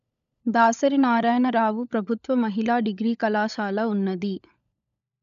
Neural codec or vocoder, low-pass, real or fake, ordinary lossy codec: codec, 16 kHz, 16 kbps, FunCodec, trained on LibriTTS, 50 frames a second; 7.2 kHz; fake; none